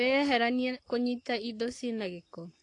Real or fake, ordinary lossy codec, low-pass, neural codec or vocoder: fake; AAC, 48 kbps; 10.8 kHz; codec, 44.1 kHz, 7.8 kbps, Pupu-Codec